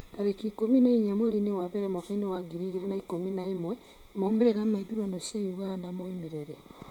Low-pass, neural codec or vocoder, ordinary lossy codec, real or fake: 19.8 kHz; vocoder, 44.1 kHz, 128 mel bands, Pupu-Vocoder; none; fake